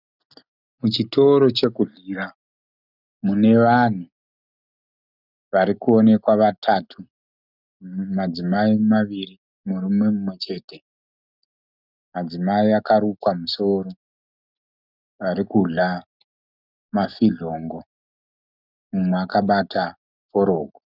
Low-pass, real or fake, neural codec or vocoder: 5.4 kHz; real; none